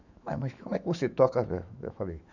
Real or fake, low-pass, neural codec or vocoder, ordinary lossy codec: fake; 7.2 kHz; autoencoder, 48 kHz, 128 numbers a frame, DAC-VAE, trained on Japanese speech; none